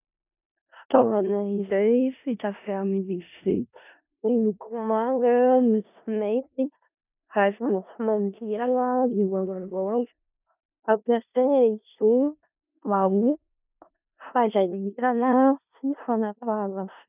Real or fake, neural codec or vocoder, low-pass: fake; codec, 16 kHz in and 24 kHz out, 0.4 kbps, LongCat-Audio-Codec, four codebook decoder; 3.6 kHz